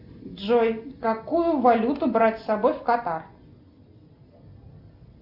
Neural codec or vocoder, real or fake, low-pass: none; real; 5.4 kHz